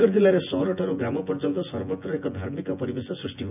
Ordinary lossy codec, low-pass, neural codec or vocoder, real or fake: none; 3.6 kHz; vocoder, 24 kHz, 100 mel bands, Vocos; fake